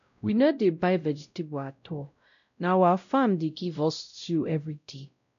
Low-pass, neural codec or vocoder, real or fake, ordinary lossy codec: 7.2 kHz; codec, 16 kHz, 0.5 kbps, X-Codec, WavLM features, trained on Multilingual LibriSpeech; fake; none